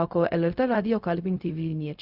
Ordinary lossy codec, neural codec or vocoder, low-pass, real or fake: Opus, 64 kbps; codec, 16 kHz, 0.4 kbps, LongCat-Audio-Codec; 5.4 kHz; fake